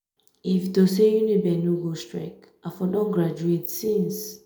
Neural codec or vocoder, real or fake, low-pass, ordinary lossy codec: none; real; none; none